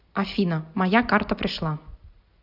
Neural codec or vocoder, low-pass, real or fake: none; 5.4 kHz; real